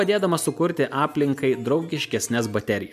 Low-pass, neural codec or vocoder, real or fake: 14.4 kHz; none; real